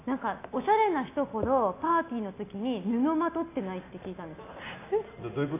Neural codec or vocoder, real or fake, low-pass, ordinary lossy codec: none; real; 3.6 kHz; AAC, 24 kbps